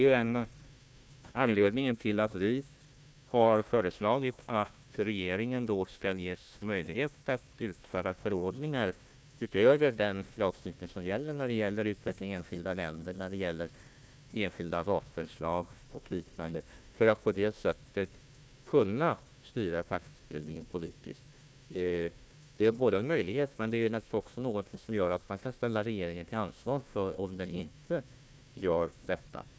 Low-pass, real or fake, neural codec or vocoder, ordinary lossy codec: none; fake; codec, 16 kHz, 1 kbps, FunCodec, trained on Chinese and English, 50 frames a second; none